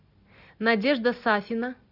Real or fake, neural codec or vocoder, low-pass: real; none; 5.4 kHz